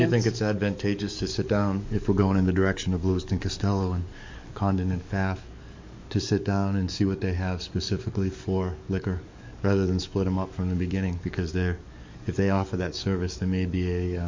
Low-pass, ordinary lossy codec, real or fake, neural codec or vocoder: 7.2 kHz; MP3, 48 kbps; fake; codec, 44.1 kHz, 7.8 kbps, DAC